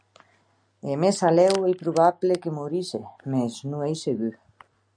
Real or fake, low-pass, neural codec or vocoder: real; 9.9 kHz; none